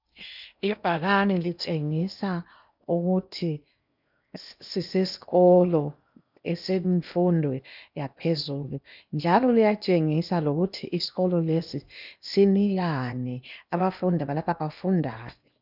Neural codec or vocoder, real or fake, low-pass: codec, 16 kHz in and 24 kHz out, 0.8 kbps, FocalCodec, streaming, 65536 codes; fake; 5.4 kHz